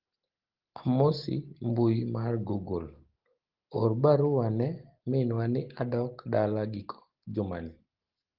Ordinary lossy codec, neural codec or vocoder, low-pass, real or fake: Opus, 16 kbps; none; 5.4 kHz; real